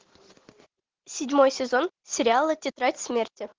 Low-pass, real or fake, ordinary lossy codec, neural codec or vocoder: 7.2 kHz; real; Opus, 16 kbps; none